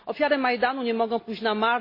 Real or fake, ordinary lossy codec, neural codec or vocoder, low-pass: real; MP3, 24 kbps; none; 5.4 kHz